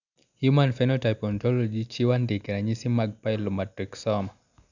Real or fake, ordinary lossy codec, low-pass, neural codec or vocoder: real; none; 7.2 kHz; none